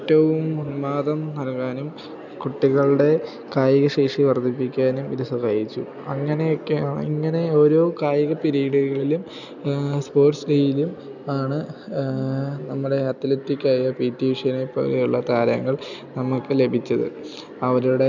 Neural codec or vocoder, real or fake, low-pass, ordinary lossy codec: none; real; 7.2 kHz; none